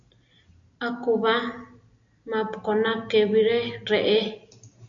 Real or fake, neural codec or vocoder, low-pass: real; none; 7.2 kHz